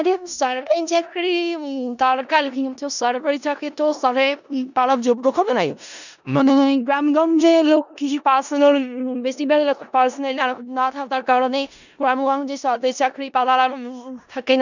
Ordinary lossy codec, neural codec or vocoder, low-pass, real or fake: none; codec, 16 kHz in and 24 kHz out, 0.4 kbps, LongCat-Audio-Codec, four codebook decoder; 7.2 kHz; fake